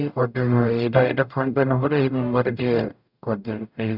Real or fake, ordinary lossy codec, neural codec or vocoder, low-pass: fake; none; codec, 44.1 kHz, 0.9 kbps, DAC; 5.4 kHz